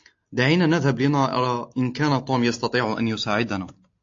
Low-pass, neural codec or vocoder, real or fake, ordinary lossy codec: 7.2 kHz; none; real; AAC, 48 kbps